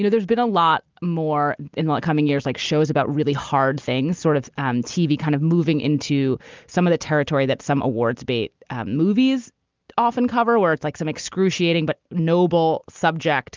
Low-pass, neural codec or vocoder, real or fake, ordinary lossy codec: 7.2 kHz; none; real; Opus, 32 kbps